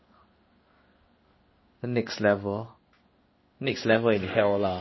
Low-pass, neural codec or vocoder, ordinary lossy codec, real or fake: 7.2 kHz; codec, 16 kHz in and 24 kHz out, 1 kbps, XY-Tokenizer; MP3, 24 kbps; fake